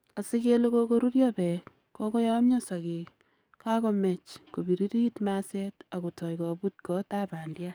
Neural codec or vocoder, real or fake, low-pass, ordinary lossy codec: codec, 44.1 kHz, 7.8 kbps, DAC; fake; none; none